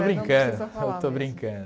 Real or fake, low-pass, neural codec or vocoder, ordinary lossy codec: real; none; none; none